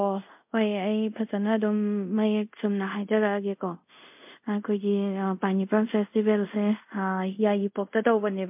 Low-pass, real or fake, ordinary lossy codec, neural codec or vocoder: 3.6 kHz; fake; MP3, 32 kbps; codec, 24 kHz, 0.5 kbps, DualCodec